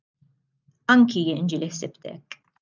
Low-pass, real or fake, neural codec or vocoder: 7.2 kHz; real; none